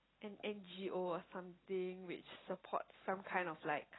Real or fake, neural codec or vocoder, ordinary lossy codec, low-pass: real; none; AAC, 16 kbps; 7.2 kHz